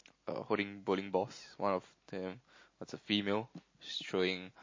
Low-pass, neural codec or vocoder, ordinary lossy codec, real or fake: 7.2 kHz; none; MP3, 32 kbps; real